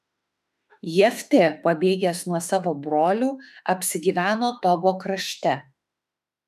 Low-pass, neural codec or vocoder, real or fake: 14.4 kHz; autoencoder, 48 kHz, 32 numbers a frame, DAC-VAE, trained on Japanese speech; fake